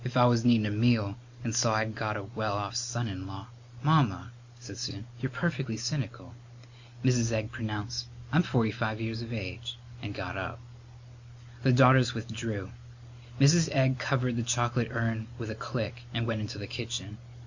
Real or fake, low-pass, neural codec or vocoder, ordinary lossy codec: real; 7.2 kHz; none; Opus, 64 kbps